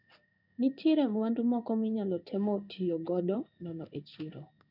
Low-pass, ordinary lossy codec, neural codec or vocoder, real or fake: 5.4 kHz; none; codec, 16 kHz in and 24 kHz out, 1 kbps, XY-Tokenizer; fake